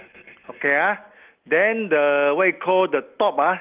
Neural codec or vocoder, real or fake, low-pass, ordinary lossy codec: none; real; 3.6 kHz; Opus, 32 kbps